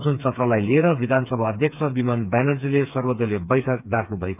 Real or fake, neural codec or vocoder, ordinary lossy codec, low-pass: fake; codec, 16 kHz, 4 kbps, FreqCodec, smaller model; none; 3.6 kHz